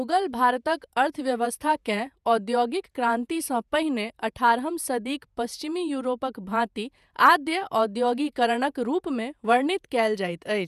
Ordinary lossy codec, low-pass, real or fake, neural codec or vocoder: none; 14.4 kHz; fake; vocoder, 48 kHz, 128 mel bands, Vocos